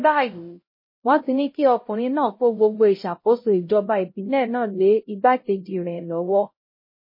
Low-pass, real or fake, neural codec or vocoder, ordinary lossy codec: 5.4 kHz; fake; codec, 16 kHz, 0.5 kbps, X-Codec, HuBERT features, trained on LibriSpeech; MP3, 24 kbps